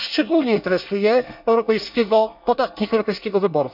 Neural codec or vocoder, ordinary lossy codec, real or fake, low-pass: codec, 24 kHz, 1 kbps, SNAC; none; fake; 5.4 kHz